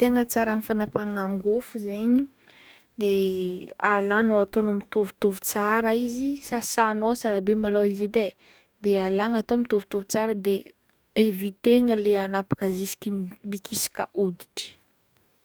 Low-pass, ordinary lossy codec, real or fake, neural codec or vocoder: none; none; fake; codec, 44.1 kHz, 2.6 kbps, DAC